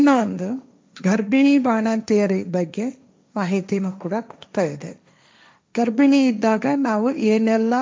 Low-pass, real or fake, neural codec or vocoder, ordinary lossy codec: none; fake; codec, 16 kHz, 1.1 kbps, Voila-Tokenizer; none